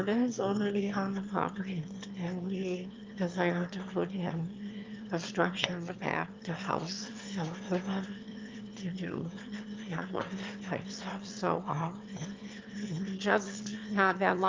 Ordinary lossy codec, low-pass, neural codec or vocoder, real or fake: Opus, 32 kbps; 7.2 kHz; autoencoder, 22.05 kHz, a latent of 192 numbers a frame, VITS, trained on one speaker; fake